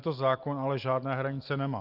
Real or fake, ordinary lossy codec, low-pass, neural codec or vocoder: real; Opus, 24 kbps; 5.4 kHz; none